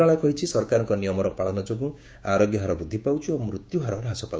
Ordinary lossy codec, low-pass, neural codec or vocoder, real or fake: none; none; codec, 16 kHz, 6 kbps, DAC; fake